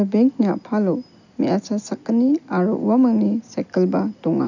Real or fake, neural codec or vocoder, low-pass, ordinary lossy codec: real; none; 7.2 kHz; none